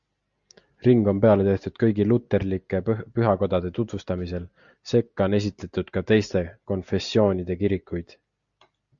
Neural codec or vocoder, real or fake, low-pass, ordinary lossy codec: none; real; 7.2 kHz; Opus, 64 kbps